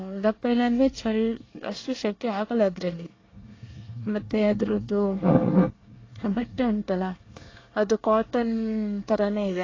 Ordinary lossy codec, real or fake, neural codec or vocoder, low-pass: AAC, 32 kbps; fake; codec, 24 kHz, 1 kbps, SNAC; 7.2 kHz